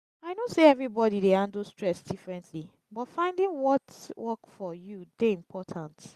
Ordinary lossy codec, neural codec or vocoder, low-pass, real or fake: Opus, 64 kbps; none; 14.4 kHz; real